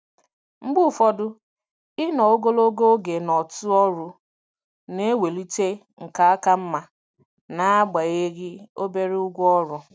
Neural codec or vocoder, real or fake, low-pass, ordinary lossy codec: none; real; none; none